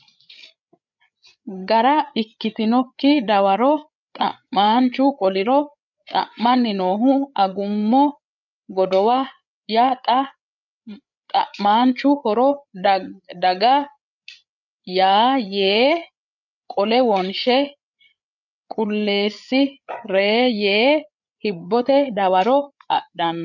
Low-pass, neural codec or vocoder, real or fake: 7.2 kHz; codec, 16 kHz, 8 kbps, FreqCodec, larger model; fake